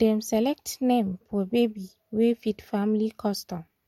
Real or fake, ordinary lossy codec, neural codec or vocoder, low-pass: real; MP3, 64 kbps; none; 19.8 kHz